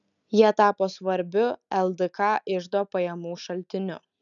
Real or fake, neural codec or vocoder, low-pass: real; none; 7.2 kHz